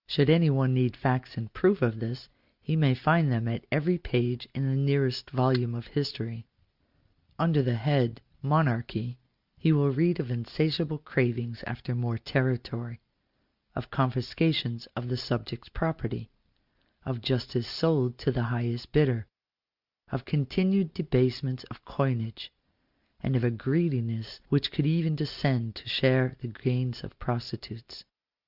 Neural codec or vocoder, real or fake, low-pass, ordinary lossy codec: none; real; 5.4 kHz; Opus, 64 kbps